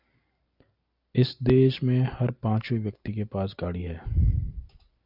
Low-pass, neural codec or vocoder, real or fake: 5.4 kHz; none; real